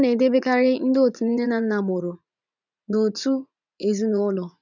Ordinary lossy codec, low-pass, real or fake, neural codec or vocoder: none; 7.2 kHz; fake; vocoder, 44.1 kHz, 80 mel bands, Vocos